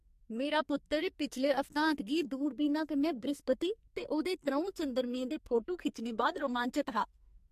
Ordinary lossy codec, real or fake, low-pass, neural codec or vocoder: MP3, 64 kbps; fake; 14.4 kHz; codec, 44.1 kHz, 2.6 kbps, SNAC